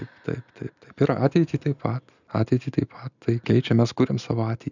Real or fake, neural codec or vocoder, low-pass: real; none; 7.2 kHz